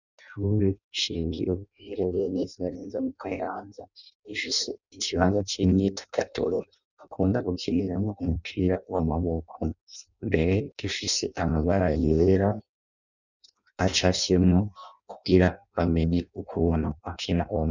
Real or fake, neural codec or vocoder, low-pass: fake; codec, 16 kHz in and 24 kHz out, 0.6 kbps, FireRedTTS-2 codec; 7.2 kHz